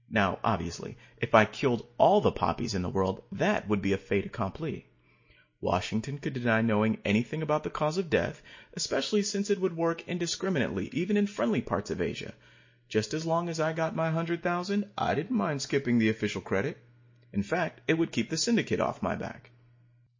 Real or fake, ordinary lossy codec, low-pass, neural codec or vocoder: real; MP3, 32 kbps; 7.2 kHz; none